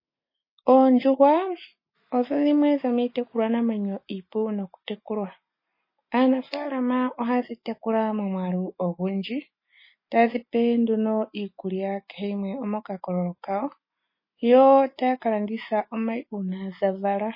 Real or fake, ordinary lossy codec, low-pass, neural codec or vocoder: real; MP3, 24 kbps; 5.4 kHz; none